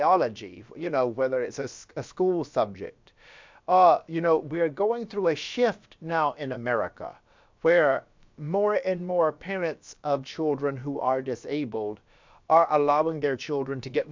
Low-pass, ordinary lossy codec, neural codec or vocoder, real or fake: 7.2 kHz; MP3, 64 kbps; codec, 16 kHz, about 1 kbps, DyCAST, with the encoder's durations; fake